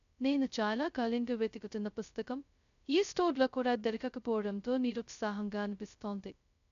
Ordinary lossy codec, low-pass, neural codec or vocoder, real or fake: none; 7.2 kHz; codec, 16 kHz, 0.2 kbps, FocalCodec; fake